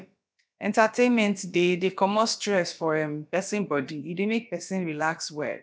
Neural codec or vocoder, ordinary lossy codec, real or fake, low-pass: codec, 16 kHz, about 1 kbps, DyCAST, with the encoder's durations; none; fake; none